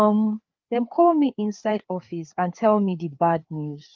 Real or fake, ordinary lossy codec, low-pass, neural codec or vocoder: fake; Opus, 24 kbps; 7.2 kHz; codec, 16 kHz, 4 kbps, FreqCodec, larger model